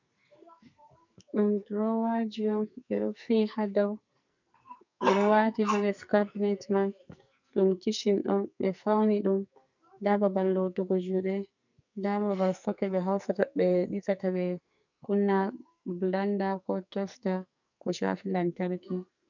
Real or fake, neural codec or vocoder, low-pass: fake; codec, 44.1 kHz, 2.6 kbps, SNAC; 7.2 kHz